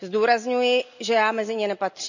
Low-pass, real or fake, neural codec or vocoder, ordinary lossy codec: 7.2 kHz; real; none; none